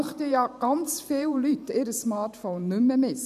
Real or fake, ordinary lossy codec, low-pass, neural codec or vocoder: fake; none; 14.4 kHz; vocoder, 44.1 kHz, 128 mel bands every 512 samples, BigVGAN v2